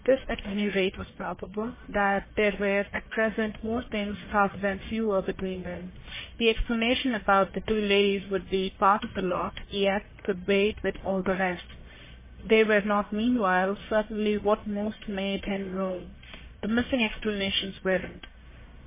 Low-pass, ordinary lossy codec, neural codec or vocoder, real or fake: 3.6 kHz; MP3, 16 kbps; codec, 44.1 kHz, 1.7 kbps, Pupu-Codec; fake